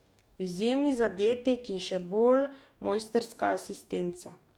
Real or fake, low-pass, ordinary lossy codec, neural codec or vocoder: fake; 19.8 kHz; none; codec, 44.1 kHz, 2.6 kbps, DAC